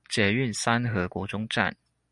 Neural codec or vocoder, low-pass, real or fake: none; 10.8 kHz; real